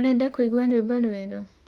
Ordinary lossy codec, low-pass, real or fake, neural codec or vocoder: Opus, 32 kbps; 19.8 kHz; fake; autoencoder, 48 kHz, 32 numbers a frame, DAC-VAE, trained on Japanese speech